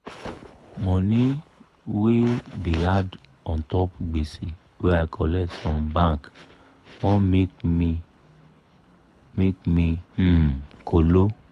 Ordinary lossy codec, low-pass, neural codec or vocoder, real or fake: none; none; codec, 24 kHz, 6 kbps, HILCodec; fake